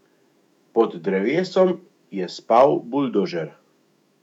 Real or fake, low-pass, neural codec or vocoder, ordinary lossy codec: fake; 19.8 kHz; autoencoder, 48 kHz, 128 numbers a frame, DAC-VAE, trained on Japanese speech; none